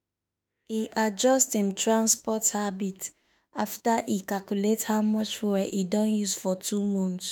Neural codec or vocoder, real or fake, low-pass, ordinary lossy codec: autoencoder, 48 kHz, 32 numbers a frame, DAC-VAE, trained on Japanese speech; fake; none; none